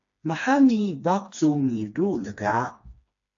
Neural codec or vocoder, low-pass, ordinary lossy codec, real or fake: codec, 16 kHz, 2 kbps, FreqCodec, smaller model; 7.2 kHz; AAC, 64 kbps; fake